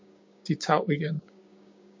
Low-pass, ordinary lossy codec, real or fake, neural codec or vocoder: 7.2 kHz; MP3, 48 kbps; fake; codec, 16 kHz, 6 kbps, DAC